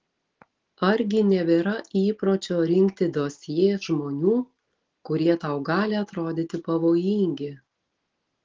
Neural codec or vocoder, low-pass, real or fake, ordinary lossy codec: none; 7.2 kHz; real; Opus, 16 kbps